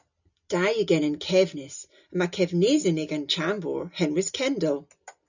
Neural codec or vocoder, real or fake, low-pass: none; real; 7.2 kHz